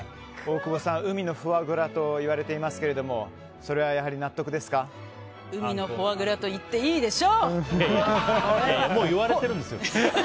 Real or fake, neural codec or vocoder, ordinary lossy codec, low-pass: real; none; none; none